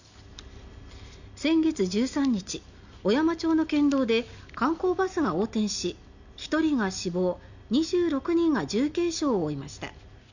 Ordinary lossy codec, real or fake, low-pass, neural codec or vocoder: none; real; 7.2 kHz; none